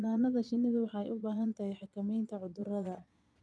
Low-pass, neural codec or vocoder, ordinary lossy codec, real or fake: none; vocoder, 22.05 kHz, 80 mel bands, Vocos; none; fake